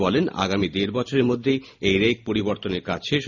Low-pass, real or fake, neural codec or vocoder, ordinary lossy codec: 7.2 kHz; real; none; none